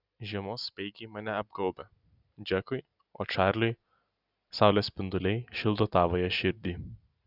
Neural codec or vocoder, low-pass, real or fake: none; 5.4 kHz; real